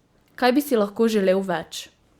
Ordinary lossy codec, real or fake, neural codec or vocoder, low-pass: Opus, 64 kbps; fake; vocoder, 44.1 kHz, 128 mel bands every 512 samples, BigVGAN v2; 19.8 kHz